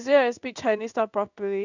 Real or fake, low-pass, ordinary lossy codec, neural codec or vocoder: fake; 7.2 kHz; none; codec, 16 kHz in and 24 kHz out, 1 kbps, XY-Tokenizer